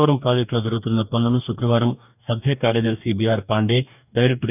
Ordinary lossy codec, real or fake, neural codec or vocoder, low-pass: none; fake; codec, 44.1 kHz, 2.6 kbps, DAC; 3.6 kHz